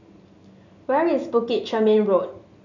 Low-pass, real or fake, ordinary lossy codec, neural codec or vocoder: 7.2 kHz; real; none; none